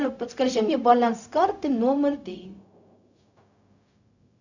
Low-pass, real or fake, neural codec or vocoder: 7.2 kHz; fake; codec, 16 kHz, 0.4 kbps, LongCat-Audio-Codec